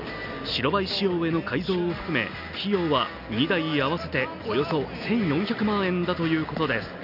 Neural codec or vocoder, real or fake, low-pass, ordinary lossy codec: none; real; 5.4 kHz; none